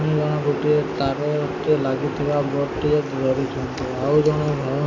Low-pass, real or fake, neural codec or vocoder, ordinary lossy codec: 7.2 kHz; real; none; MP3, 48 kbps